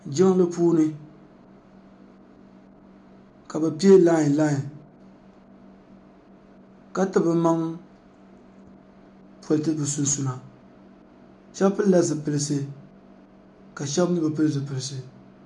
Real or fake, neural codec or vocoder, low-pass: real; none; 10.8 kHz